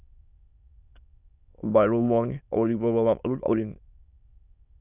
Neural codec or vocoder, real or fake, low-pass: autoencoder, 22.05 kHz, a latent of 192 numbers a frame, VITS, trained on many speakers; fake; 3.6 kHz